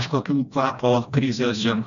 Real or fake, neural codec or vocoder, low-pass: fake; codec, 16 kHz, 1 kbps, FreqCodec, smaller model; 7.2 kHz